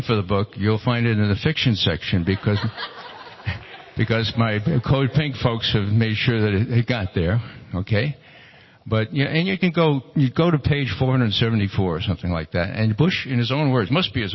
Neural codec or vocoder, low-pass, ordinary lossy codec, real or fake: none; 7.2 kHz; MP3, 24 kbps; real